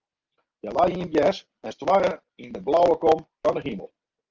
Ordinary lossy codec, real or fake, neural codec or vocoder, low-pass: Opus, 24 kbps; real; none; 7.2 kHz